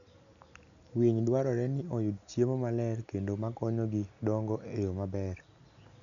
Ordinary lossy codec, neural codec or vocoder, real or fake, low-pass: none; none; real; 7.2 kHz